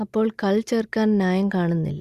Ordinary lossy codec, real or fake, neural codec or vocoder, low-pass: Opus, 64 kbps; real; none; 14.4 kHz